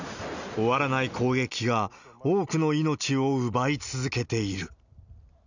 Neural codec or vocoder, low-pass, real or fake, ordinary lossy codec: none; 7.2 kHz; real; none